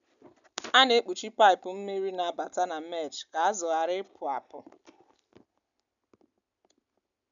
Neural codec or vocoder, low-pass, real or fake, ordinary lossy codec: none; 7.2 kHz; real; none